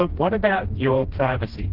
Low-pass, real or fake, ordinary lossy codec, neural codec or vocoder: 5.4 kHz; fake; Opus, 16 kbps; codec, 16 kHz, 1 kbps, FreqCodec, smaller model